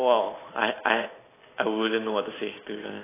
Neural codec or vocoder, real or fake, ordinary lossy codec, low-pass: none; real; AAC, 16 kbps; 3.6 kHz